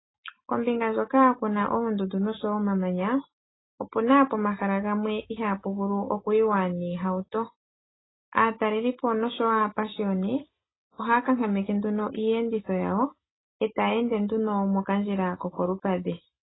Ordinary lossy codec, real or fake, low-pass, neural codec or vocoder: AAC, 16 kbps; real; 7.2 kHz; none